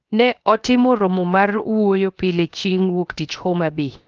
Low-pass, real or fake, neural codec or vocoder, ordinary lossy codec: 7.2 kHz; fake; codec, 16 kHz, 0.7 kbps, FocalCodec; Opus, 24 kbps